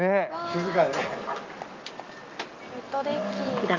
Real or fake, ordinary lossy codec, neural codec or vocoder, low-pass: real; Opus, 24 kbps; none; 7.2 kHz